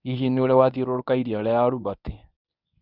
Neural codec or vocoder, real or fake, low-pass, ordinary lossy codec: codec, 24 kHz, 0.9 kbps, WavTokenizer, medium speech release version 1; fake; 5.4 kHz; none